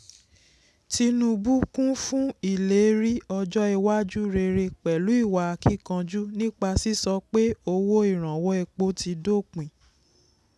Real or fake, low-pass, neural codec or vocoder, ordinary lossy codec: real; none; none; none